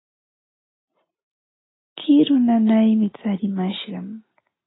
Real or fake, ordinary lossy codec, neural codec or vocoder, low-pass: real; AAC, 16 kbps; none; 7.2 kHz